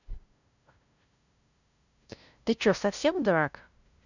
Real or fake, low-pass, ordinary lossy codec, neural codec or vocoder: fake; 7.2 kHz; none; codec, 16 kHz, 0.5 kbps, FunCodec, trained on LibriTTS, 25 frames a second